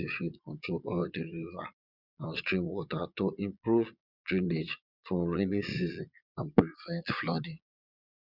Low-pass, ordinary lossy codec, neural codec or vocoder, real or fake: 5.4 kHz; none; vocoder, 22.05 kHz, 80 mel bands, Vocos; fake